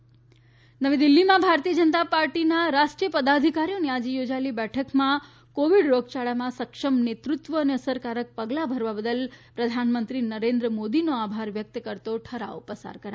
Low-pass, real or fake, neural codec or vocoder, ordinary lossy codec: none; real; none; none